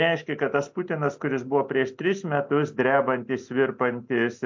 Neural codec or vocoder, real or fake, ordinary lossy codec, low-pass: none; real; MP3, 48 kbps; 7.2 kHz